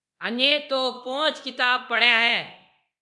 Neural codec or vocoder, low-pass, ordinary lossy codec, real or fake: codec, 24 kHz, 0.9 kbps, DualCodec; 10.8 kHz; MP3, 96 kbps; fake